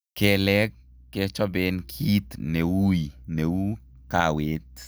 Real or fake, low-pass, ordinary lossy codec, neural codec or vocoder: real; none; none; none